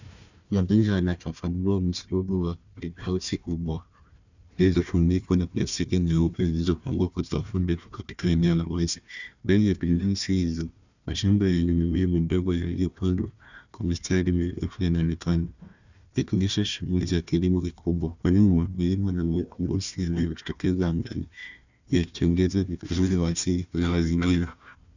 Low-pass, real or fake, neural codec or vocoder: 7.2 kHz; fake; codec, 16 kHz, 1 kbps, FunCodec, trained on Chinese and English, 50 frames a second